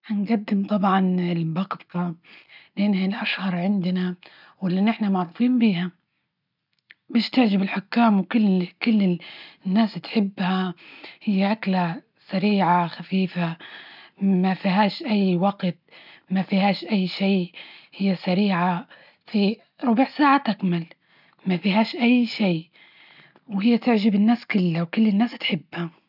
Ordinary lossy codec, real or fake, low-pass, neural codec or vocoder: none; real; 5.4 kHz; none